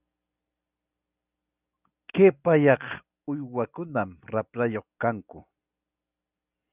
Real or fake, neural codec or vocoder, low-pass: real; none; 3.6 kHz